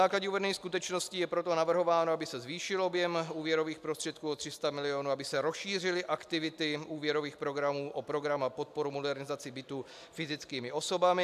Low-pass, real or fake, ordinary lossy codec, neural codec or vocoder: 14.4 kHz; real; AAC, 96 kbps; none